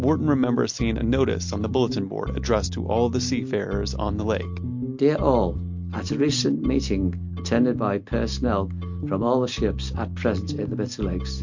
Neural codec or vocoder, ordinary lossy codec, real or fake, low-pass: none; MP3, 48 kbps; real; 7.2 kHz